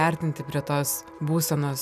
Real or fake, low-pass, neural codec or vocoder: real; 14.4 kHz; none